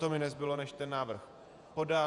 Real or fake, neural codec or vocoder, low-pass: fake; vocoder, 48 kHz, 128 mel bands, Vocos; 10.8 kHz